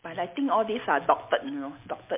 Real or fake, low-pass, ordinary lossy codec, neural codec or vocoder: real; 3.6 kHz; MP3, 32 kbps; none